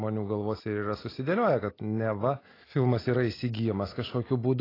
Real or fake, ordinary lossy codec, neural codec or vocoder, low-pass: real; AAC, 24 kbps; none; 5.4 kHz